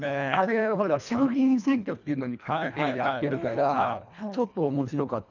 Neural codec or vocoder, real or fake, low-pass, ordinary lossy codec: codec, 24 kHz, 1.5 kbps, HILCodec; fake; 7.2 kHz; none